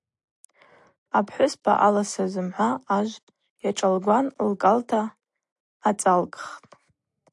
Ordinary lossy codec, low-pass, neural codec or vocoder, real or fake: AAC, 64 kbps; 10.8 kHz; none; real